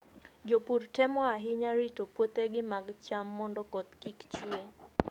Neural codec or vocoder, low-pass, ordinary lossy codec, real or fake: codec, 44.1 kHz, 7.8 kbps, DAC; 19.8 kHz; none; fake